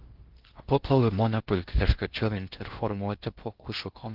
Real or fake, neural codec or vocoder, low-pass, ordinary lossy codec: fake; codec, 16 kHz in and 24 kHz out, 0.6 kbps, FocalCodec, streaming, 4096 codes; 5.4 kHz; Opus, 16 kbps